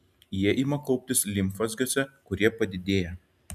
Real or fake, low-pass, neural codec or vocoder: real; 14.4 kHz; none